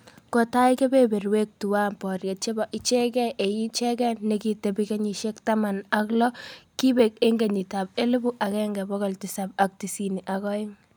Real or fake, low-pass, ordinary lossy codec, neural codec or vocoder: real; none; none; none